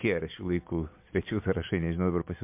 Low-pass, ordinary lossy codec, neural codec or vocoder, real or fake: 3.6 kHz; MP3, 32 kbps; none; real